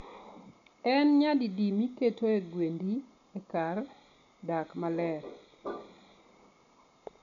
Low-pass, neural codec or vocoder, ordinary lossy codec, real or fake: 7.2 kHz; none; none; real